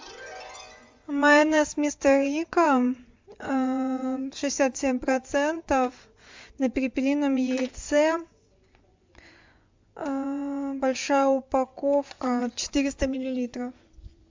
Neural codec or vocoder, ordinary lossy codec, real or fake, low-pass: vocoder, 24 kHz, 100 mel bands, Vocos; MP3, 64 kbps; fake; 7.2 kHz